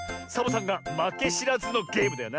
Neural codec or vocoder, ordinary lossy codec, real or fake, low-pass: none; none; real; none